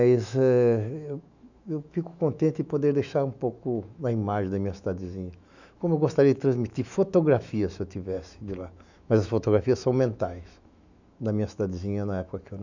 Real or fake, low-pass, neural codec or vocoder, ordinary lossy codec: fake; 7.2 kHz; autoencoder, 48 kHz, 128 numbers a frame, DAC-VAE, trained on Japanese speech; none